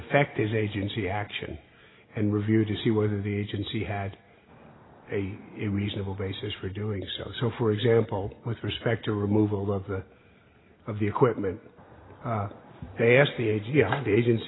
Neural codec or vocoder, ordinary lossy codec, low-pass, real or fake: none; AAC, 16 kbps; 7.2 kHz; real